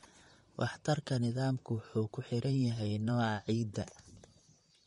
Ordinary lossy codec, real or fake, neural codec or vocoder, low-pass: MP3, 48 kbps; fake; vocoder, 44.1 kHz, 128 mel bands, Pupu-Vocoder; 19.8 kHz